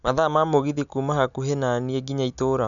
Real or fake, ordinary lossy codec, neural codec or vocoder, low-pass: real; none; none; 7.2 kHz